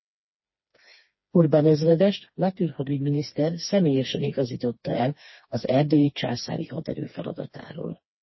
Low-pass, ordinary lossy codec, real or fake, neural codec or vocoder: 7.2 kHz; MP3, 24 kbps; fake; codec, 16 kHz, 2 kbps, FreqCodec, smaller model